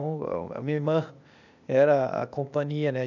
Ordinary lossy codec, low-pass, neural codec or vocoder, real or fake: none; 7.2 kHz; codec, 16 kHz, 0.8 kbps, ZipCodec; fake